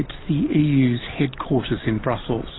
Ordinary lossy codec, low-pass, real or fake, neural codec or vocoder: AAC, 16 kbps; 7.2 kHz; real; none